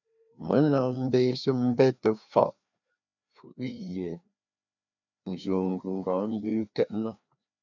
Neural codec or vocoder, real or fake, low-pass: codec, 16 kHz, 2 kbps, FreqCodec, larger model; fake; 7.2 kHz